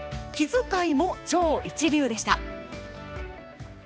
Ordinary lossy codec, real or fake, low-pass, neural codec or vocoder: none; fake; none; codec, 16 kHz, 2 kbps, X-Codec, HuBERT features, trained on balanced general audio